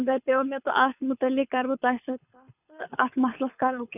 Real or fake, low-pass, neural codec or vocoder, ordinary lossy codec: fake; 3.6 kHz; codec, 24 kHz, 6 kbps, HILCodec; none